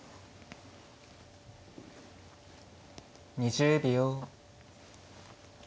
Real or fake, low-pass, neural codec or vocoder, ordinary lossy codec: real; none; none; none